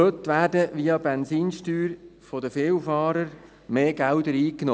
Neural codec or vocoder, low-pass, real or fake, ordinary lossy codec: none; none; real; none